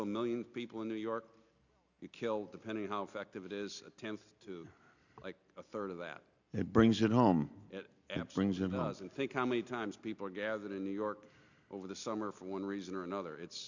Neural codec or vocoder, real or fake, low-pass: none; real; 7.2 kHz